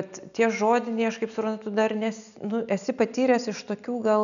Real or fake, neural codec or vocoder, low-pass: real; none; 7.2 kHz